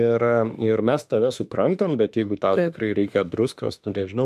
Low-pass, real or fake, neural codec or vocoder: 14.4 kHz; fake; autoencoder, 48 kHz, 32 numbers a frame, DAC-VAE, trained on Japanese speech